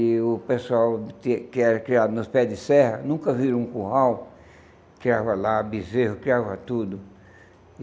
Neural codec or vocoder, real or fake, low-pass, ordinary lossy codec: none; real; none; none